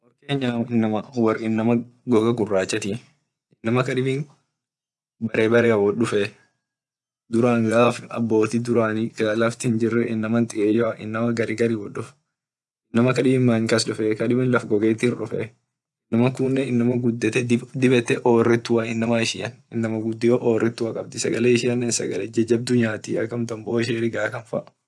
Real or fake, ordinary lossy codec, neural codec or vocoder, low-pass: fake; none; vocoder, 24 kHz, 100 mel bands, Vocos; none